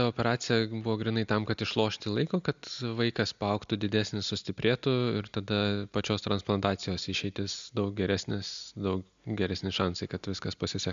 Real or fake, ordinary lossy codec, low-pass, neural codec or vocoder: real; MP3, 64 kbps; 7.2 kHz; none